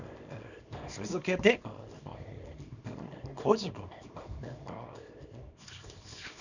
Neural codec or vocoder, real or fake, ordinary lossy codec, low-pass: codec, 24 kHz, 0.9 kbps, WavTokenizer, small release; fake; none; 7.2 kHz